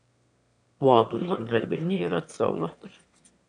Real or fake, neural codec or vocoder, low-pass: fake; autoencoder, 22.05 kHz, a latent of 192 numbers a frame, VITS, trained on one speaker; 9.9 kHz